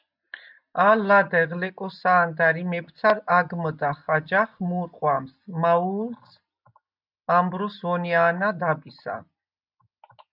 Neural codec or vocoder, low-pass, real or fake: none; 5.4 kHz; real